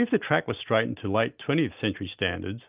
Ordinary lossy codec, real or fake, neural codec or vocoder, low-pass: Opus, 24 kbps; real; none; 3.6 kHz